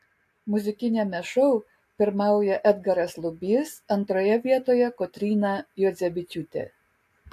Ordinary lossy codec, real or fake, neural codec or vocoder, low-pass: AAC, 64 kbps; real; none; 14.4 kHz